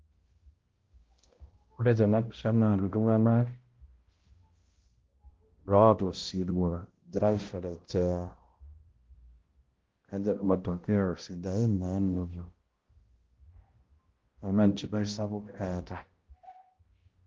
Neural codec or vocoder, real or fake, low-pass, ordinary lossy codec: codec, 16 kHz, 0.5 kbps, X-Codec, HuBERT features, trained on balanced general audio; fake; 7.2 kHz; Opus, 16 kbps